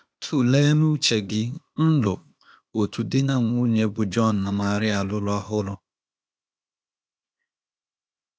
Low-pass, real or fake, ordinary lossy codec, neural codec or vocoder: none; fake; none; codec, 16 kHz, 0.8 kbps, ZipCodec